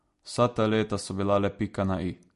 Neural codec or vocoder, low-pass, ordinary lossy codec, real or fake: none; 14.4 kHz; MP3, 48 kbps; real